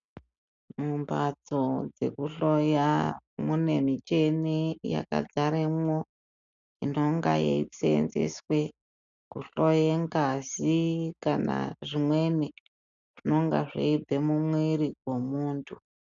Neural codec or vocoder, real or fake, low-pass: none; real; 7.2 kHz